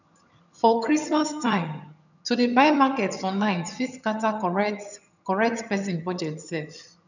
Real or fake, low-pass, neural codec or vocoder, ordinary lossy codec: fake; 7.2 kHz; vocoder, 22.05 kHz, 80 mel bands, HiFi-GAN; none